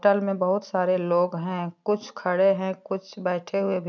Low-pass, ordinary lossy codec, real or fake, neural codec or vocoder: 7.2 kHz; none; real; none